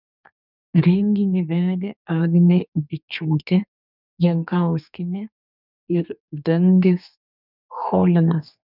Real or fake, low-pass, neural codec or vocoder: fake; 5.4 kHz; codec, 32 kHz, 1.9 kbps, SNAC